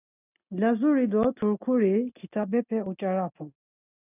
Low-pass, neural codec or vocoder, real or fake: 3.6 kHz; none; real